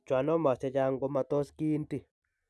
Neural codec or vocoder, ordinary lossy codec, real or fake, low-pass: none; none; real; none